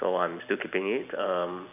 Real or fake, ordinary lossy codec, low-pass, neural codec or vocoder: real; none; 3.6 kHz; none